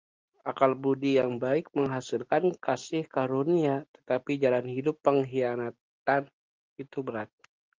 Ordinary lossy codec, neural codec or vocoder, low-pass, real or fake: Opus, 32 kbps; none; 7.2 kHz; real